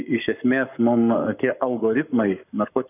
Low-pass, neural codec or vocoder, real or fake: 3.6 kHz; codec, 44.1 kHz, 7.8 kbps, Pupu-Codec; fake